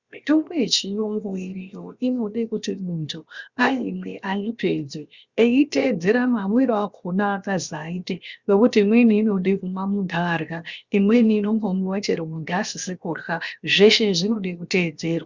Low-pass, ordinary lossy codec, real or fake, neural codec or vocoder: 7.2 kHz; Opus, 64 kbps; fake; codec, 16 kHz, 0.7 kbps, FocalCodec